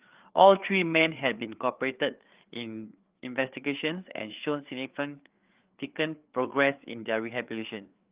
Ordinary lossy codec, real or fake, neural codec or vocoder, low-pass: Opus, 32 kbps; fake; codec, 16 kHz, 4 kbps, FreqCodec, larger model; 3.6 kHz